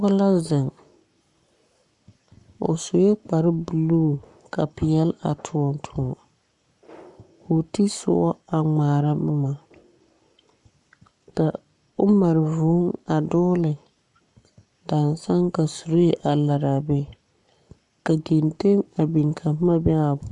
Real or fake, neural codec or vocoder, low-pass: fake; codec, 44.1 kHz, 7.8 kbps, Pupu-Codec; 10.8 kHz